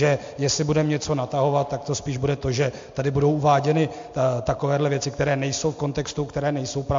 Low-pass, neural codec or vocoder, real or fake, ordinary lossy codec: 7.2 kHz; none; real; MP3, 48 kbps